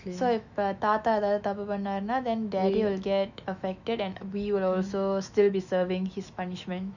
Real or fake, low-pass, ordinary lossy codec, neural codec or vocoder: fake; 7.2 kHz; none; autoencoder, 48 kHz, 128 numbers a frame, DAC-VAE, trained on Japanese speech